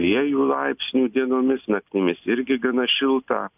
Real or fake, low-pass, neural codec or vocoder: real; 3.6 kHz; none